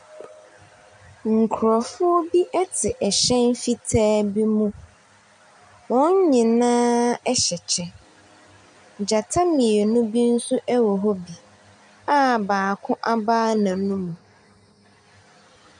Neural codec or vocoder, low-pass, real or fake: none; 9.9 kHz; real